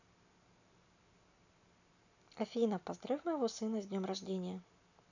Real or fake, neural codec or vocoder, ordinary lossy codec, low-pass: real; none; none; 7.2 kHz